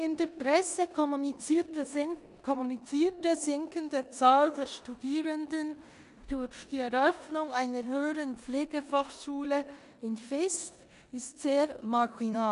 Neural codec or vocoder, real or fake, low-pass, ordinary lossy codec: codec, 16 kHz in and 24 kHz out, 0.9 kbps, LongCat-Audio-Codec, four codebook decoder; fake; 10.8 kHz; none